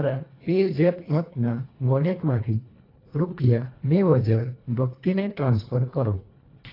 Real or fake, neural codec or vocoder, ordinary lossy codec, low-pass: fake; codec, 24 kHz, 1.5 kbps, HILCodec; AAC, 24 kbps; 5.4 kHz